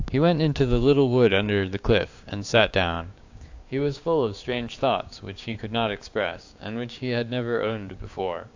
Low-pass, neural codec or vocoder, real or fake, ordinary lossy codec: 7.2 kHz; codec, 16 kHz, 6 kbps, DAC; fake; AAC, 48 kbps